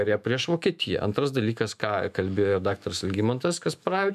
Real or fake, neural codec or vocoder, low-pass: fake; autoencoder, 48 kHz, 128 numbers a frame, DAC-VAE, trained on Japanese speech; 14.4 kHz